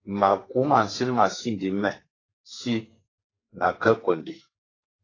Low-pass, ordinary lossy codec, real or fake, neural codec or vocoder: 7.2 kHz; AAC, 32 kbps; fake; codec, 32 kHz, 1.9 kbps, SNAC